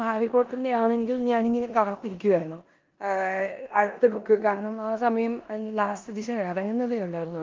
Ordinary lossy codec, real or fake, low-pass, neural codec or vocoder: Opus, 32 kbps; fake; 7.2 kHz; codec, 16 kHz in and 24 kHz out, 0.9 kbps, LongCat-Audio-Codec, four codebook decoder